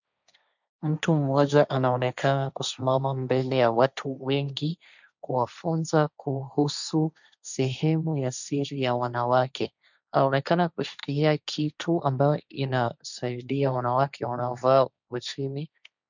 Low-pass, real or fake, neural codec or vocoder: 7.2 kHz; fake; codec, 16 kHz, 1.1 kbps, Voila-Tokenizer